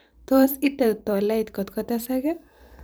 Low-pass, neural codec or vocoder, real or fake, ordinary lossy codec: none; vocoder, 44.1 kHz, 128 mel bands every 512 samples, BigVGAN v2; fake; none